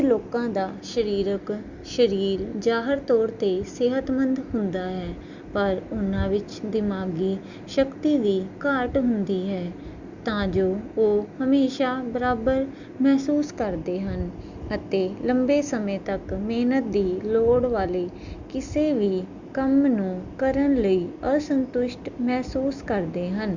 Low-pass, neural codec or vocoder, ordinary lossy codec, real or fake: 7.2 kHz; none; Opus, 64 kbps; real